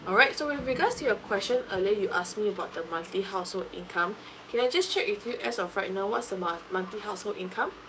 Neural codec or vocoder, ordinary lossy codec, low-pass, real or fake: codec, 16 kHz, 6 kbps, DAC; none; none; fake